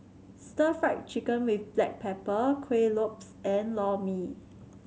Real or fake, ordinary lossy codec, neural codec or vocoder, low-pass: real; none; none; none